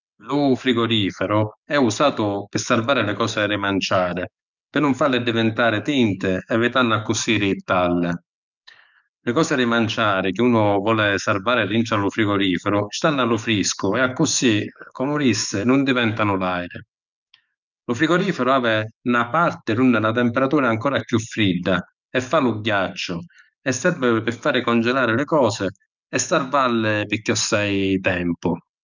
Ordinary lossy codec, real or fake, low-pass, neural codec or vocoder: none; fake; 7.2 kHz; codec, 44.1 kHz, 7.8 kbps, DAC